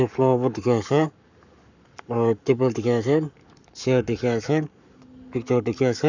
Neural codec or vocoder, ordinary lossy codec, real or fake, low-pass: codec, 44.1 kHz, 7.8 kbps, Pupu-Codec; none; fake; 7.2 kHz